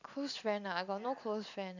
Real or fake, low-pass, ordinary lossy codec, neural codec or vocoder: real; 7.2 kHz; none; none